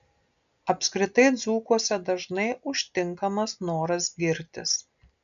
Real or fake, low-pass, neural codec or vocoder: real; 7.2 kHz; none